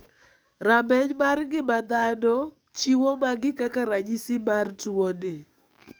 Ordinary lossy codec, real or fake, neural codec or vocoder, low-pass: none; fake; codec, 44.1 kHz, 7.8 kbps, DAC; none